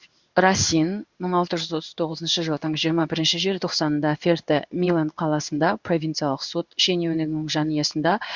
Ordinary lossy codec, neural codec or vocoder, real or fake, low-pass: Opus, 64 kbps; codec, 16 kHz in and 24 kHz out, 1 kbps, XY-Tokenizer; fake; 7.2 kHz